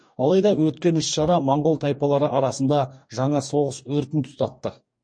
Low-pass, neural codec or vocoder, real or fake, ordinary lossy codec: 9.9 kHz; codec, 44.1 kHz, 2.6 kbps, DAC; fake; MP3, 48 kbps